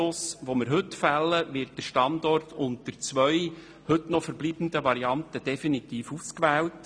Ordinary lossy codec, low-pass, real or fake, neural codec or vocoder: none; 9.9 kHz; real; none